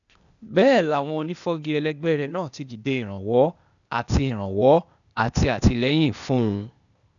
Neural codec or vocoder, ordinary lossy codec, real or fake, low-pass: codec, 16 kHz, 0.8 kbps, ZipCodec; none; fake; 7.2 kHz